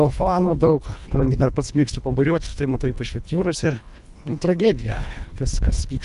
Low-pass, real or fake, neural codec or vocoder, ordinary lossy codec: 10.8 kHz; fake; codec, 24 kHz, 1.5 kbps, HILCodec; MP3, 96 kbps